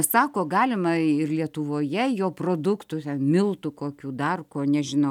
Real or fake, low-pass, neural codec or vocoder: real; 19.8 kHz; none